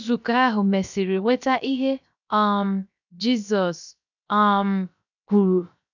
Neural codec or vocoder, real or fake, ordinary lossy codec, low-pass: codec, 16 kHz, 0.7 kbps, FocalCodec; fake; none; 7.2 kHz